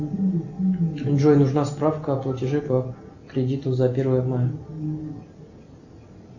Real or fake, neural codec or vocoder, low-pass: real; none; 7.2 kHz